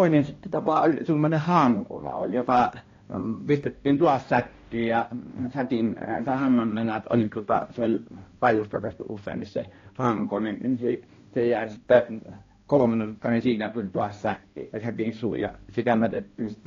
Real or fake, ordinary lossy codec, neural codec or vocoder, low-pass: fake; AAC, 32 kbps; codec, 16 kHz, 1 kbps, X-Codec, HuBERT features, trained on balanced general audio; 7.2 kHz